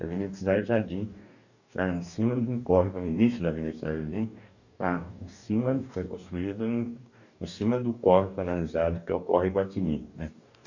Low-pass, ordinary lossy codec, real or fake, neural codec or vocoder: 7.2 kHz; none; fake; codec, 44.1 kHz, 2.6 kbps, DAC